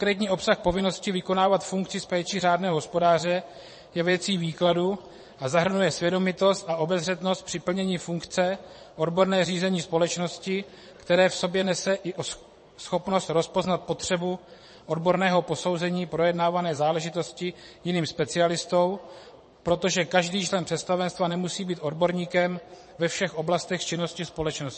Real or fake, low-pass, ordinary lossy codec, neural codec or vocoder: fake; 9.9 kHz; MP3, 32 kbps; vocoder, 22.05 kHz, 80 mel bands, Vocos